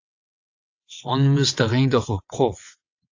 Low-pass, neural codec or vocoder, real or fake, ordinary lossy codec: 7.2 kHz; vocoder, 22.05 kHz, 80 mel bands, WaveNeXt; fake; AAC, 48 kbps